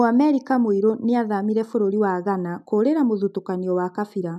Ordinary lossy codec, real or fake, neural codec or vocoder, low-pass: none; real; none; 14.4 kHz